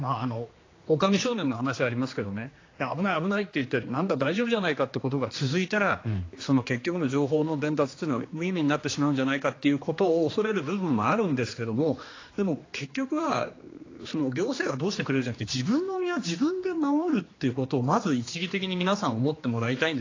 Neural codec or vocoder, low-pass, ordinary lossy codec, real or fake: codec, 16 kHz, 2 kbps, X-Codec, HuBERT features, trained on general audio; 7.2 kHz; AAC, 32 kbps; fake